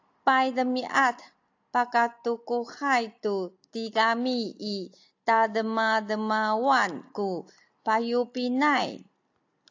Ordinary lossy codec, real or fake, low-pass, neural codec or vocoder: AAC, 48 kbps; real; 7.2 kHz; none